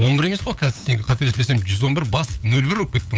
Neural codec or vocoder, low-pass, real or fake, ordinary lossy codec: codec, 16 kHz, 8 kbps, FunCodec, trained on LibriTTS, 25 frames a second; none; fake; none